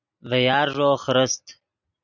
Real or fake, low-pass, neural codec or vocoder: real; 7.2 kHz; none